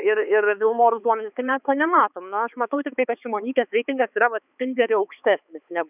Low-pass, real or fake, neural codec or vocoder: 3.6 kHz; fake; codec, 16 kHz, 4 kbps, X-Codec, HuBERT features, trained on balanced general audio